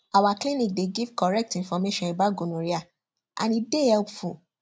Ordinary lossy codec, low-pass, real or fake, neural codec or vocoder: none; none; real; none